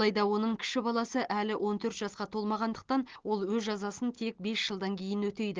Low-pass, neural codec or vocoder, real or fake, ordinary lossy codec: 7.2 kHz; none; real; Opus, 16 kbps